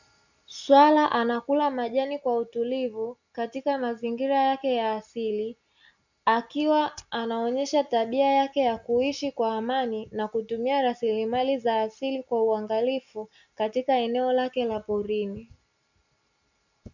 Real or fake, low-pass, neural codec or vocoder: real; 7.2 kHz; none